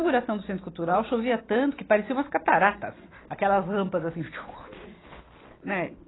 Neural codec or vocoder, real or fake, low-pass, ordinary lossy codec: none; real; 7.2 kHz; AAC, 16 kbps